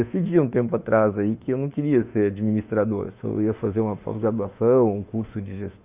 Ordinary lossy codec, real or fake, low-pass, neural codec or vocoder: Opus, 64 kbps; fake; 3.6 kHz; autoencoder, 48 kHz, 32 numbers a frame, DAC-VAE, trained on Japanese speech